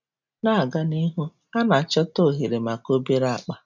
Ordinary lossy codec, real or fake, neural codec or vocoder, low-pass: none; real; none; 7.2 kHz